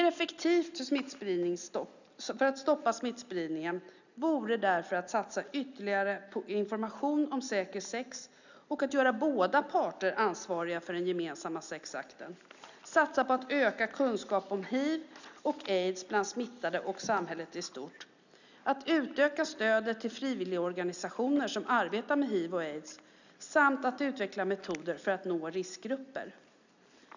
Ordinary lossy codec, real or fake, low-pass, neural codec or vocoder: none; real; 7.2 kHz; none